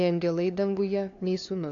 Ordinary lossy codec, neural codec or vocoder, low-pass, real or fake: AAC, 48 kbps; codec, 16 kHz, 2 kbps, FunCodec, trained on LibriTTS, 25 frames a second; 7.2 kHz; fake